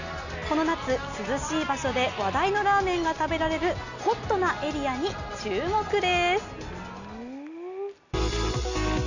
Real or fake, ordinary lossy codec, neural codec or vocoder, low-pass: real; none; none; 7.2 kHz